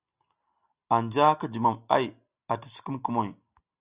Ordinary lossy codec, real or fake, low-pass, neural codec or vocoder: Opus, 64 kbps; real; 3.6 kHz; none